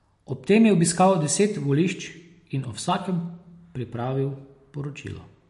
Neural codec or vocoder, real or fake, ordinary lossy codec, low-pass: none; real; MP3, 48 kbps; 14.4 kHz